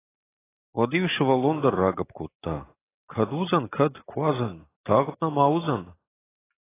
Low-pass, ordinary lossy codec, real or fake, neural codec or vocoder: 3.6 kHz; AAC, 16 kbps; real; none